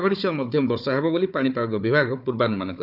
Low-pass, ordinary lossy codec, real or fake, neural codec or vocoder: 5.4 kHz; none; fake; codec, 16 kHz, 4 kbps, FunCodec, trained on Chinese and English, 50 frames a second